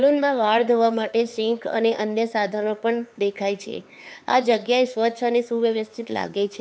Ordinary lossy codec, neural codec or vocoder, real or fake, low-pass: none; codec, 16 kHz, 4 kbps, X-Codec, HuBERT features, trained on LibriSpeech; fake; none